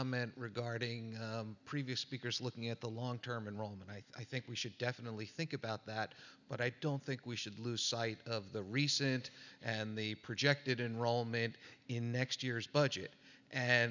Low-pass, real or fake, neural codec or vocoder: 7.2 kHz; real; none